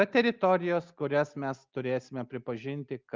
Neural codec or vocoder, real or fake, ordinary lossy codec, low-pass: none; real; Opus, 32 kbps; 7.2 kHz